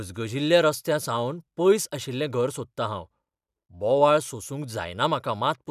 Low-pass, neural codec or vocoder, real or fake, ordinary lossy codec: 14.4 kHz; none; real; none